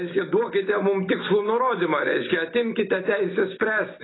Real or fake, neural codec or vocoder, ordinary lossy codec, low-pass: real; none; AAC, 16 kbps; 7.2 kHz